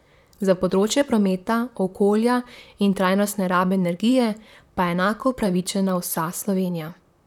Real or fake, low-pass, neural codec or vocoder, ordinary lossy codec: fake; 19.8 kHz; vocoder, 44.1 kHz, 128 mel bands, Pupu-Vocoder; none